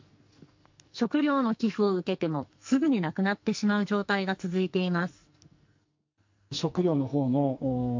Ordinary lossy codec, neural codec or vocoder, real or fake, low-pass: MP3, 48 kbps; codec, 44.1 kHz, 2.6 kbps, SNAC; fake; 7.2 kHz